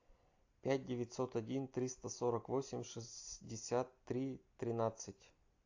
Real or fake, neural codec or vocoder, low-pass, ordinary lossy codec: real; none; 7.2 kHz; AAC, 48 kbps